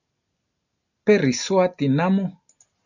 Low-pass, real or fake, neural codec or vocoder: 7.2 kHz; real; none